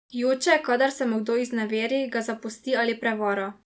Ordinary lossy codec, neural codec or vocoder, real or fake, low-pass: none; none; real; none